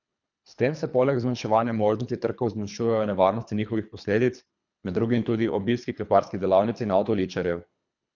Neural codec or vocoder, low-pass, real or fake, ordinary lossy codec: codec, 24 kHz, 3 kbps, HILCodec; 7.2 kHz; fake; none